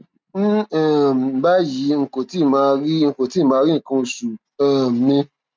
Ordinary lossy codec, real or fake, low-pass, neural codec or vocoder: none; real; none; none